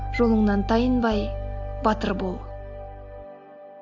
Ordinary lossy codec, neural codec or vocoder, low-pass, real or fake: AAC, 48 kbps; none; 7.2 kHz; real